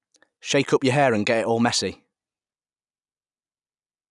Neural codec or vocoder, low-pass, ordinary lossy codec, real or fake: none; 10.8 kHz; none; real